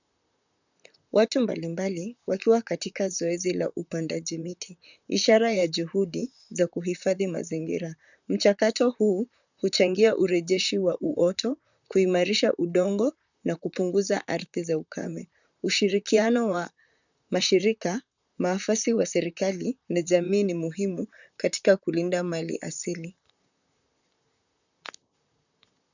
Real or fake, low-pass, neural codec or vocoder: fake; 7.2 kHz; vocoder, 44.1 kHz, 128 mel bands, Pupu-Vocoder